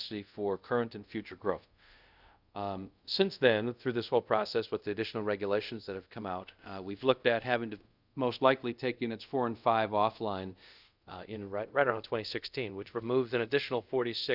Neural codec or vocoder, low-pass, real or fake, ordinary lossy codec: codec, 24 kHz, 0.5 kbps, DualCodec; 5.4 kHz; fake; Opus, 64 kbps